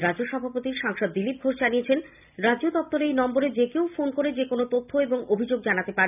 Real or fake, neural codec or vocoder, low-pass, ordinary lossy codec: real; none; 3.6 kHz; none